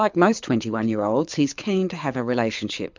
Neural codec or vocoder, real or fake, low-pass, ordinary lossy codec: codec, 24 kHz, 6 kbps, HILCodec; fake; 7.2 kHz; MP3, 64 kbps